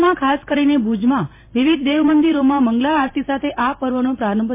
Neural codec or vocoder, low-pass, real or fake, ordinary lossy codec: vocoder, 44.1 kHz, 128 mel bands every 512 samples, BigVGAN v2; 3.6 kHz; fake; MP3, 24 kbps